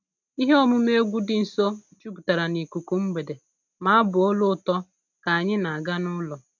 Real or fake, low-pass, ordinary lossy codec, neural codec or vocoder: real; 7.2 kHz; none; none